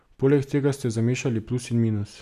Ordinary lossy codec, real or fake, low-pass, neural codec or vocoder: none; real; 14.4 kHz; none